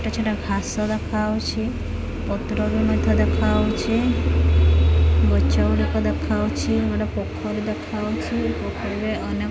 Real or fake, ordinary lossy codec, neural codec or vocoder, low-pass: real; none; none; none